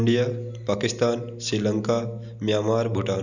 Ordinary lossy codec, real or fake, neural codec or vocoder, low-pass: none; real; none; 7.2 kHz